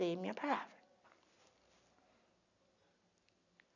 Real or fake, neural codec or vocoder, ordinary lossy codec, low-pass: real; none; none; 7.2 kHz